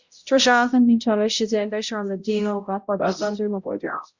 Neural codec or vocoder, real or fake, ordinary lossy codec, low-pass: codec, 16 kHz, 0.5 kbps, X-Codec, HuBERT features, trained on balanced general audio; fake; Opus, 64 kbps; 7.2 kHz